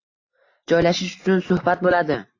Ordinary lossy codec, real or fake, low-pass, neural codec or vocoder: AAC, 32 kbps; real; 7.2 kHz; none